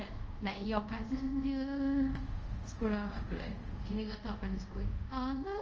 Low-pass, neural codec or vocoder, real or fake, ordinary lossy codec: 7.2 kHz; codec, 24 kHz, 0.5 kbps, DualCodec; fake; Opus, 24 kbps